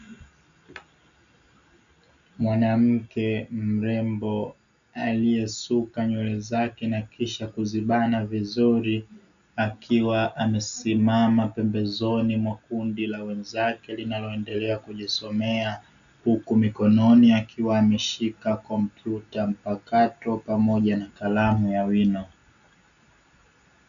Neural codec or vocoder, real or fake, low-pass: none; real; 7.2 kHz